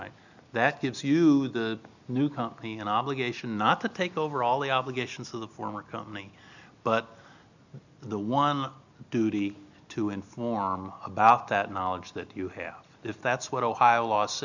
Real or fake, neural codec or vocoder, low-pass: real; none; 7.2 kHz